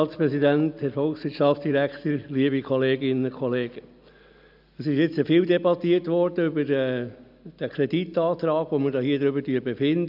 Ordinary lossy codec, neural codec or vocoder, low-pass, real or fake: none; none; 5.4 kHz; real